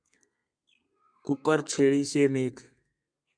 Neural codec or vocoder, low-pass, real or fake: codec, 32 kHz, 1.9 kbps, SNAC; 9.9 kHz; fake